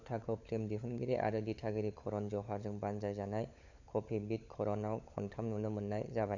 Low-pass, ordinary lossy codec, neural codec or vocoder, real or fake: 7.2 kHz; none; codec, 16 kHz, 16 kbps, FunCodec, trained on LibriTTS, 50 frames a second; fake